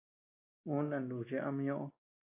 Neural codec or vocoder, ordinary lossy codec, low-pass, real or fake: none; AAC, 24 kbps; 3.6 kHz; real